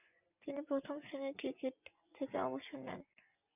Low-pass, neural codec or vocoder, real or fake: 3.6 kHz; vocoder, 24 kHz, 100 mel bands, Vocos; fake